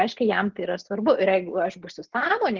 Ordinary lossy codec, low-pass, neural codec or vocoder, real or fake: Opus, 16 kbps; 7.2 kHz; none; real